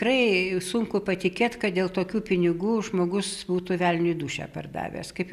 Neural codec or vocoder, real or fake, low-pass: none; real; 14.4 kHz